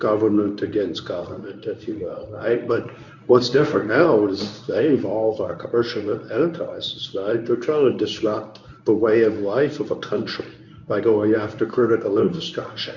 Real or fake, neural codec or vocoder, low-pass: fake; codec, 24 kHz, 0.9 kbps, WavTokenizer, medium speech release version 1; 7.2 kHz